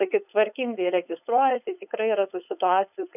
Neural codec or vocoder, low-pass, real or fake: codec, 16 kHz, 4.8 kbps, FACodec; 3.6 kHz; fake